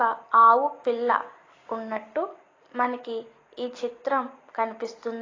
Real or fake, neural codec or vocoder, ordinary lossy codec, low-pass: real; none; AAC, 32 kbps; 7.2 kHz